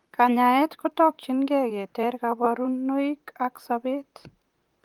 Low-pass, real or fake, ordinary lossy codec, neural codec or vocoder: 19.8 kHz; fake; Opus, 32 kbps; vocoder, 44.1 kHz, 128 mel bands, Pupu-Vocoder